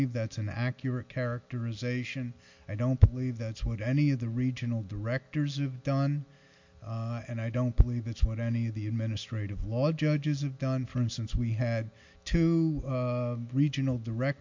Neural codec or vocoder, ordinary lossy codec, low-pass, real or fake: none; MP3, 48 kbps; 7.2 kHz; real